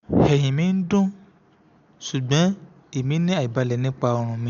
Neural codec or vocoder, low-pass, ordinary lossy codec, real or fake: none; 7.2 kHz; none; real